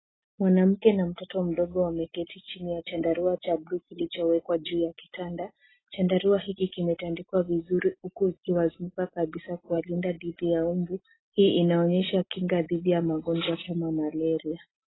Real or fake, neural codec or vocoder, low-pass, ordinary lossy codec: real; none; 7.2 kHz; AAC, 16 kbps